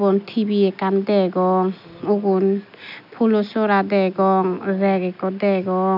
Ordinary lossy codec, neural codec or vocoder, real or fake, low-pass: none; none; real; 5.4 kHz